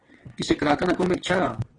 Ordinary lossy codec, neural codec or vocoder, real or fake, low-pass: AAC, 32 kbps; vocoder, 22.05 kHz, 80 mel bands, WaveNeXt; fake; 9.9 kHz